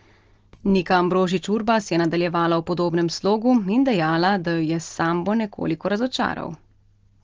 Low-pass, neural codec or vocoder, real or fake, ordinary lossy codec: 7.2 kHz; none; real; Opus, 16 kbps